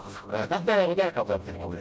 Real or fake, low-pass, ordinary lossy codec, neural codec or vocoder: fake; none; none; codec, 16 kHz, 0.5 kbps, FreqCodec, smaller model